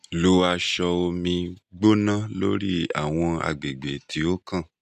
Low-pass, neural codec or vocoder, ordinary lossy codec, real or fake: 14.4 kHz; none; none; real